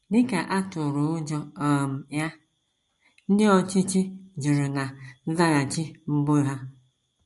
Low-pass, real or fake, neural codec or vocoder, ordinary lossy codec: 14.4 kHz; real; none; MP3, 48 kbps